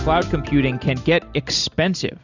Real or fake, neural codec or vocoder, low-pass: real; none; 7.2 kHz